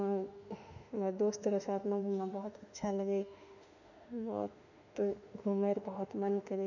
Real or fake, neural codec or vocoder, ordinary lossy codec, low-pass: fake; autoencoder, 48 kHz, 32 numbers a frame, DAC-VAE, trained on Japanese speech; none; 7.2 kHz